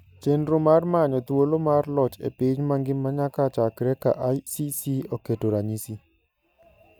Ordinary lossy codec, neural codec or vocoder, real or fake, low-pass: none; none; real; none